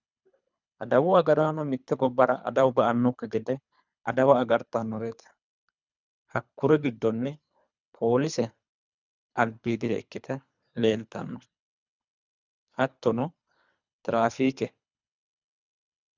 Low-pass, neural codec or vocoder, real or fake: 7.2 kHz; codec, 24 kHz, 3 kbps, HILCodec; fake